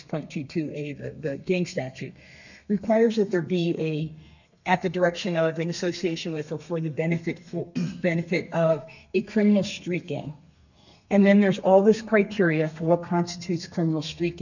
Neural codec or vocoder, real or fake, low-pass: codec, 32 kHz, 1.9 kbps, SNAC; fake; 7.2 kHz